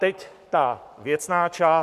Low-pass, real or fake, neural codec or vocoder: 14.4 kHz; fake; codec, 44.1 kHz, 7.8 kbps, Pupu-Codec